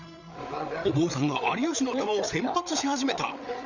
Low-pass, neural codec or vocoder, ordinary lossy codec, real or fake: 7.2 kHz; codec, 16 kHz, 8 kbps, FreqCodec, larger model; none; fake